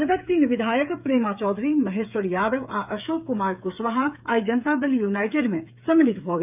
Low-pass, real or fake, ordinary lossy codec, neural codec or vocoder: 3.6 kHz; fake; none; codec, 16 kHz, 8 kbps, FreqCodec, smaller model